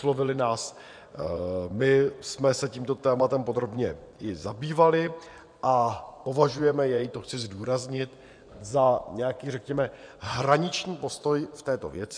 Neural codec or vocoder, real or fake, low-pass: vocoder, 24 kHz, 100 mel bands, Vocos; fake; 9.9 kHz